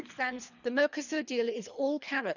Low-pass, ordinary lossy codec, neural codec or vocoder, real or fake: 7.2 kHz; none; codec, 24 kHz, 3 kbps, HILCodec; fake